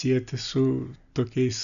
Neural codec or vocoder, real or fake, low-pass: none; real; 7.2 kHz